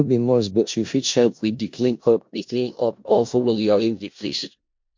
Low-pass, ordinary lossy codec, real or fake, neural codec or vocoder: 7.2 kHz; MP3, 48 kbps; fake; codec, 16 kHz in and 24 kHz out, 0.4 kbps, LongCat-Audio-Codec, four codebook decoder